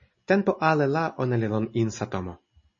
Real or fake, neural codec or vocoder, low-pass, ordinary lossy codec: real; none; 7.2 kHz; MP3, 32 kbps